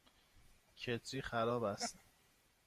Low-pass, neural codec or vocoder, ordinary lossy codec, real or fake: 14.4 kHz; vocoder, 48 kHz, 128 mel bands, Vocos; Opus, 64 kbps; fake